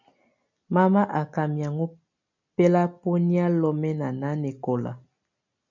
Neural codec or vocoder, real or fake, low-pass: none; real; 7.2 kHz